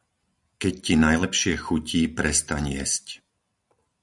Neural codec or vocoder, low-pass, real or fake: none; 10.8 kHz; real